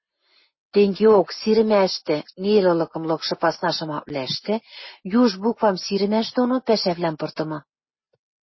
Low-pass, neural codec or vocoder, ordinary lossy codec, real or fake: 7.2 kHz; none; MP3, 24 kbps; real